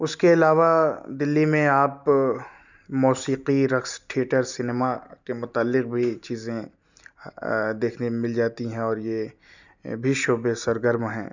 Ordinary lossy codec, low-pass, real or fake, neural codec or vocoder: none; 7.2 kHz; real; none